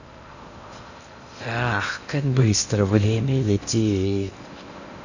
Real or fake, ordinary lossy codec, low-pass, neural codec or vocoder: fake; none; 7.2 kHz; codec, 16 kHz in and 24 kHz out, 0.6 kbps, FocalCodec, streaming, 2048 codes